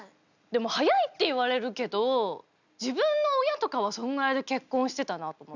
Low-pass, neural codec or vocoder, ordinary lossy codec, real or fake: 7.2 kHz; none; none; real